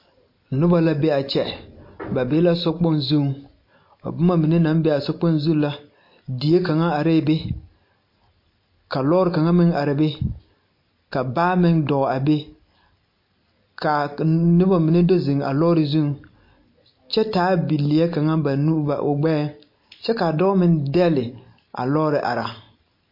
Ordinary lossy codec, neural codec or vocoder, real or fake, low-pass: MP3, 32 kbps; none; real; 5.4 kHz